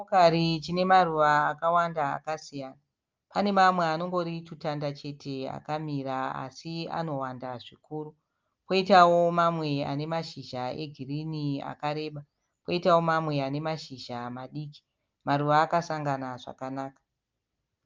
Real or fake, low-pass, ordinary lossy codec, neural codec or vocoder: real; 7.2 kHz; Opus, 24 kbps; none